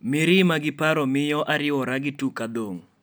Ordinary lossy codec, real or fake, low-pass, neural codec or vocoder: none; real; none; none